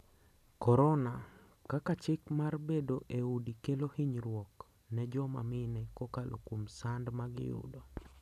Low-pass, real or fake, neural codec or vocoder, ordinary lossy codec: 14.4 kHz; real; none; none